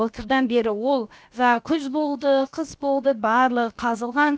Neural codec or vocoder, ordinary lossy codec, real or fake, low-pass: codec, 16 kHz, about 1 kbps, DyCAST, with the encoder's durations; none; fake; none